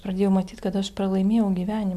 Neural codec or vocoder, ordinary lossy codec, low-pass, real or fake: none; MP3, 96 kbps; 14.4 kHz; real